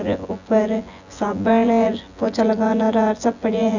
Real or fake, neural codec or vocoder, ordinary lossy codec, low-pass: fake; vocoder, 24 kHz, 100 mel bands, Vocos; none; 7.2 kHz